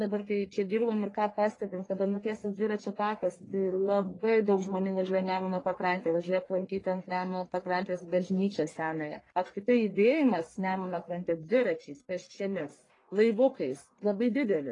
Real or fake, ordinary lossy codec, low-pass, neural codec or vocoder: fake; AAC, 32 kbps; 10.8 kHz; codec, 44.1 kHz, 1.7 kbps, Pupu-Codec